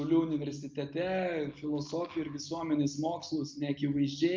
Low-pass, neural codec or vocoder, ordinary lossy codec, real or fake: 7.2 kHz; none; Opus, 32 kbps; real